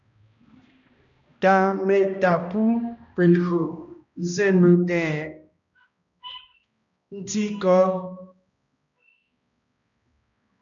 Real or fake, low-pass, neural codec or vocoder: fake; 7.2 kHz; codec, 16 kHz, 1 kbps, X-Codec, HuBERT features, trained on balanced general audio